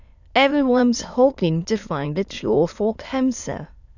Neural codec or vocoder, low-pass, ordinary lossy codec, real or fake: autoencoder, 22.05 kHz, a latent of 192 numbers a frame, VITS, trained on many speakers; 7.2 kHz; none; fake